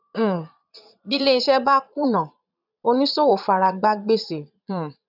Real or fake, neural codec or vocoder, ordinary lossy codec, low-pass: fake; vocoder, 22.05 kHz, 80 mel bands, Vocos; none; 5.4 kHz